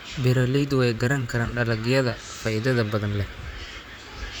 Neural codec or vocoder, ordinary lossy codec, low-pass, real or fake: vocoder, 44.1 kHz, 128 mel bands, Pupu-Vocoder; none; none; fake